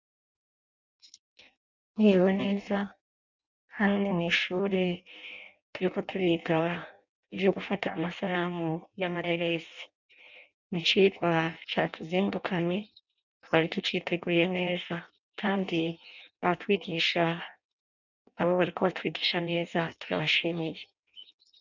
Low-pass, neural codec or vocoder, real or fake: 7.2 kHz; codec, 16 kHz in and 24 kHz out, 0.6 kbps, FireRedTTS-2 codec; fake